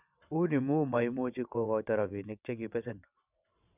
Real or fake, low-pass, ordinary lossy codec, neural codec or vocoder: fake; 3.6 kHz; none; vocoder, 22.05 kHz, 80 mel bands, WaveNeXt